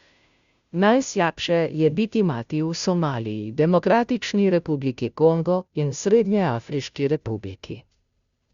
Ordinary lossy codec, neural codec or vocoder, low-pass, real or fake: Opus, 64 kbps; codec, 16 kHz, 0.5 kbps, FunCodec, trained on Chinese and English, 25 frames a second; 7.2 kHz; fake